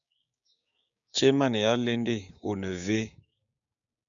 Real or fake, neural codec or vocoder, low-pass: fake; codec, 16 kHz, 6 kbps, DAC; 7.2 kHz